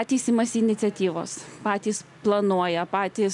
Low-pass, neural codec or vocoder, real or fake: 10.8 kHz; none; real